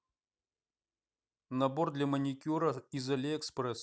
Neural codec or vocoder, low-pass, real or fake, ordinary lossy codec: none; none; real; none